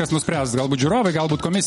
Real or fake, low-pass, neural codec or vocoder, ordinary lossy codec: real; 19.8 kHz; none; MP3, 48 kbps